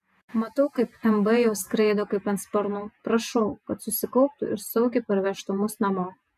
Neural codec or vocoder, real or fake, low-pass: vocoder, 48 kHz, 128 mel bands, Vocos; fake; 14.4 kHz